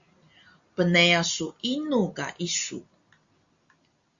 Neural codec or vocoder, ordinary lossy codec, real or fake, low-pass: none; Opus, 64 kbps; real; 7.2 kHz